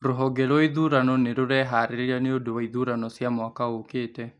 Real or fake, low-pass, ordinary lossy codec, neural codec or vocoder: real; 10.8 kHz; none; none